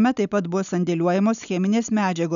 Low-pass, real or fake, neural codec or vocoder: 7.2 kHz; real; none